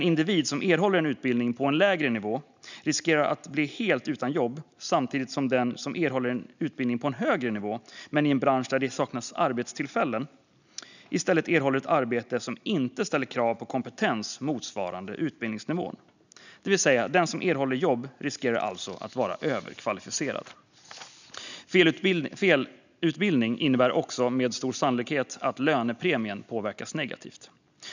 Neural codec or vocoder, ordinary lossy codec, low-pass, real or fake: none; none; 7.2 kHz; real